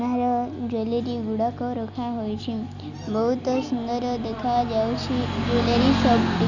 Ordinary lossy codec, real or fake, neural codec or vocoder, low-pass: none; real; none; 7.2 kHz